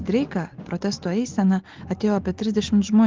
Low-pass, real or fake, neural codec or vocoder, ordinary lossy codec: 7.2 kHz; real; none; Opus, 16 kbps